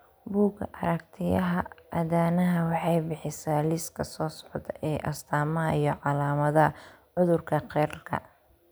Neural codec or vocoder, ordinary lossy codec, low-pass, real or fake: none; none; none; real